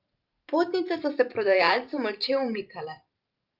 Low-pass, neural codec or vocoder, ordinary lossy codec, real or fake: 5.4 kHz; vocoder, 44.1 kHz, 128 mel bands every 512 samples, BigVGAN v2; Opus, 24 kbps; fake